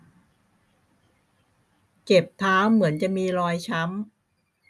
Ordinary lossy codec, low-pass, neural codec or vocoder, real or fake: none; none; none; real